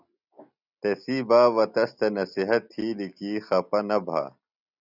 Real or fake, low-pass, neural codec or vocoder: real; 5.4 kHz; none